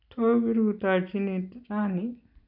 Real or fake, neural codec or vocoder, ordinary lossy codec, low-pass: real; none; none; 5.4 kHz